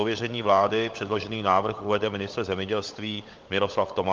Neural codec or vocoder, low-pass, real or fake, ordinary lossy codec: codec, 16 kHz, 16 kbps, FunCodec, trained on LibriTTS, 50 frames a second; 7.2 kHz; fake; Opus, 32 kbps